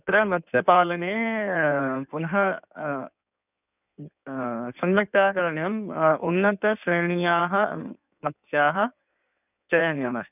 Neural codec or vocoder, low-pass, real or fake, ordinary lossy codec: codec, 16 kHz in and 24 kHz out, 1.1 kbps, FireRedTTS-2 codec; 3.6 kHz; fake; none